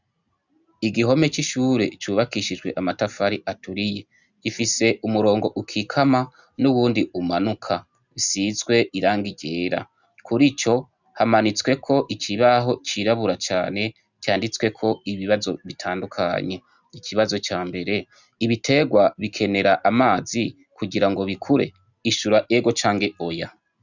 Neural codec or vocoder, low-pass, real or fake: none; 7.2 kHz; real